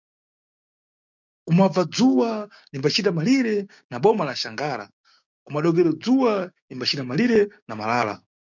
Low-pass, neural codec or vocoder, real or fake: 7.2 kHz; codec, 16 kHz, 6 kbps, DAC; fake